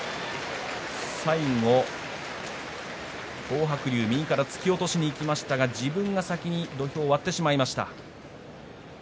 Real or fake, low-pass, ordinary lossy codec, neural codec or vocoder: real; none; none; none